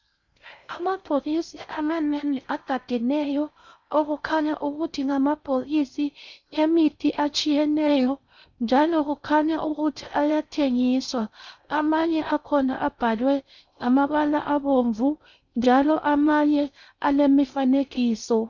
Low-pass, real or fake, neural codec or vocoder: 7.2 kHz; fake; codec, 16 kHz in and 24 kHz out, 0.6 kbps, FocalCodec, streaming, 4096 codes